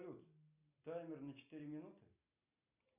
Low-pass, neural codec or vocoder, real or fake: 3.6 kHz; none; real